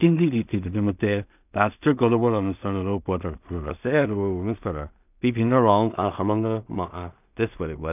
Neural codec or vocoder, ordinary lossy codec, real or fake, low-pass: codec, 16 kHz in and 24 kHz out, 0.4 kbps, LongCat-Audio-Codec, two codebook decoder; none; fake; 3.6 kHz